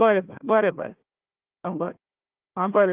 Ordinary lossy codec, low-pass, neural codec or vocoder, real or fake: Opus, 32 kbps; 3.6 kHz; codec, 16 kHz, 1 kbps, FunCodec, trained on Chinese and English, 50 frames a second; fake